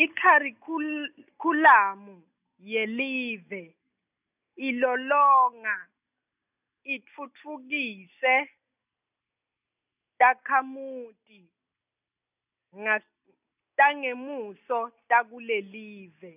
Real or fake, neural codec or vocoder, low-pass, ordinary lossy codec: real; none; 3.6 kHz; none